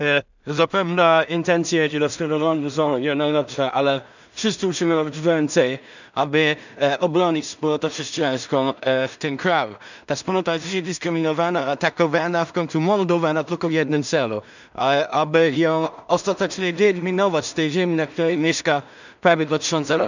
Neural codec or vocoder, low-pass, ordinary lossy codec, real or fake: codec, 16 kHz in and 24 kHz out, 0.4 kbps, LongCat-Audio-Codec, two codebook decoder; 7.2 kHz; none; fake